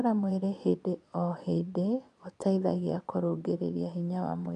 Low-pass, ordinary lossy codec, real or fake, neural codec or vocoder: 9.9 kHz; none; fake; vocoder, 22.05 kHz, 80 mel bands, Vocos